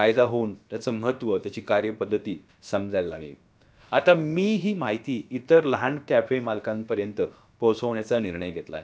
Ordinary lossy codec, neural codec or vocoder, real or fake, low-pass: none; codec, 16 kHz, about 1 kbps, DyCAST, with the encoder's durations; fake; none